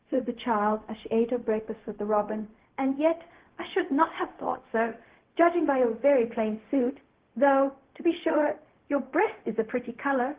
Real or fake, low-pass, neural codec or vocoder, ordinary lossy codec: fake; 3.6 kHz; codec, 16 kHz, 0.4 kbps, LongCat-Audio-Codec; Opus, 16 kbps